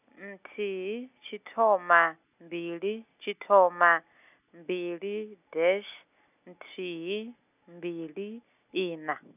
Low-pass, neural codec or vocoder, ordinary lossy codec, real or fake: 3.6 kHz; none; none; real